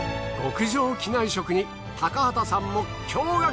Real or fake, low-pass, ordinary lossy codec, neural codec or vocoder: real; none; none; none